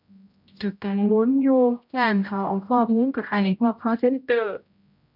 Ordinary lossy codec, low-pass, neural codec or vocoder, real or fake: none; 5.4 kHz; codec, 16 kHz, 0.5 kbps, X-Codec, HuBERT features, trained on general audio; fake